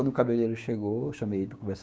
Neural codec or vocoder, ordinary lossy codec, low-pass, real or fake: codec, 16 kHz, 6 kbps, DAC; none; none; fake